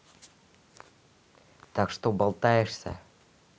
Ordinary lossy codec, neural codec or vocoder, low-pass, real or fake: none; none; none; real